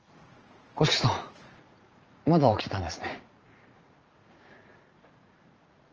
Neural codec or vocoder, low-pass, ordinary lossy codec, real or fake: vocoder, 44.1 kHz, 80 mel bands, Vocos; 7.2 kHz; Opus, 24 kbps; fake